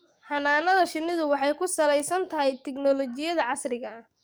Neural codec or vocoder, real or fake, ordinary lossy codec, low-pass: codec, 44.1 kHz, 7.8 kbps, DAC; fake; none; none